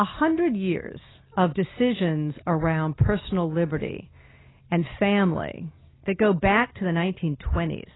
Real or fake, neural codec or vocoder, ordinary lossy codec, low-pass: real; none; AAC, 16 kbps; 7.2 kHz